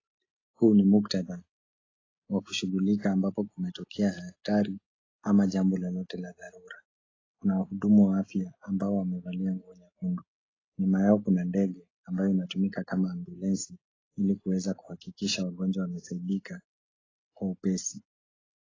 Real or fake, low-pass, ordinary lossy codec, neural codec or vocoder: real; 7.2 kHz; AAC, 32 kbps; none